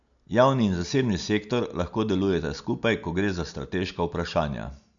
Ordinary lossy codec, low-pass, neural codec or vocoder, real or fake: none; 7.2 kHz; none; real